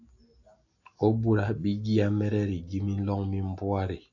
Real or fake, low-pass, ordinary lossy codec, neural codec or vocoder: real; 7.2 kHz; AAC, 48 kbps; none